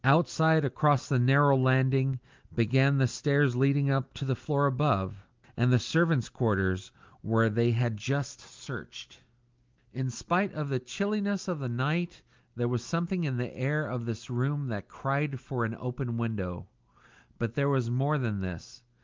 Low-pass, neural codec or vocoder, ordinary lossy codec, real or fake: 7.2 kHz; none; Opus, 32 kbps; real